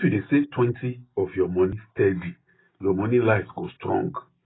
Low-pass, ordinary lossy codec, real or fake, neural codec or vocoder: 7.2 kHz; AAC, 16 kbps; real; none